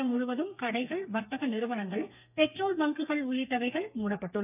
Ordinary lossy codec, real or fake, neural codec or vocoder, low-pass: none; fake; codec, 32 kHz, 1.9 kbps, SNAC; 3.6 kHz